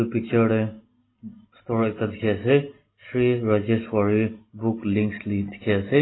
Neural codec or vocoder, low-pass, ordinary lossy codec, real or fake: none; 7.2 kHz; AAC, 16 kbps; real